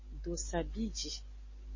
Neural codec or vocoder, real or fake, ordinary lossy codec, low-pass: none; real; MP3, 32 kbps; 7.2 kHz